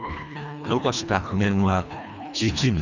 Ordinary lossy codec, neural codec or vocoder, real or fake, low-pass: none; codec, 24 kHz, 1.5 kbps, HILCodec; fake; 7.2 kHz